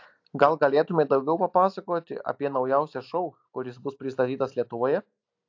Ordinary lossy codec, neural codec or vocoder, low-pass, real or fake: AAC, 48 kbps; none; 7.2 kHz; real